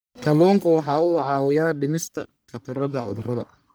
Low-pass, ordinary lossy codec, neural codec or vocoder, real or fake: none; none; codec, 44.1 kHz, 1.7 kbps, Pupu-Codec; fake